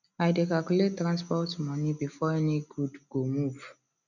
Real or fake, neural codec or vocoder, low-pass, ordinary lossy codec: real; none; 7.2 kHz; none